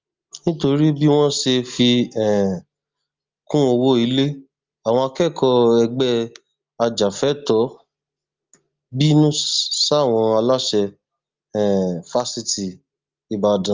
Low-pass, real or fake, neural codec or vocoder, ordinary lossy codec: 7.2 kHz; real; none; Opus, 32 kbps